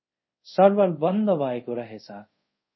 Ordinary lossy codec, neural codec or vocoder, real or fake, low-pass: MP3, 24 kbps; codec, 24 kHz, 0.5 kbps, DualCodec; fake; 7.2 kHz